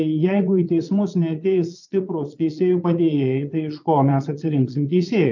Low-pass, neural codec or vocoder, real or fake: 7.2 kHz; vocoder, 22.05 kHz, 80 mel bands, Vocos; fake